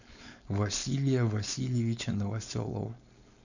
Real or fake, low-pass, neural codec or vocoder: fake; 7.2 kHz; codec, 16 kHz, 4.8 kbps, FACodec